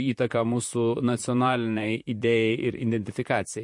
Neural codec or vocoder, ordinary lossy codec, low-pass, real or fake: vocoder, 44.1 kHz, 128 mel bands, Pupu-Vocoder; MP3, 48 kbps; 10.8 kHz; fake